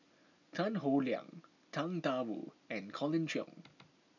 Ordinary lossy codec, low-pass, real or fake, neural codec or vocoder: none; 7.2 kHz; real; none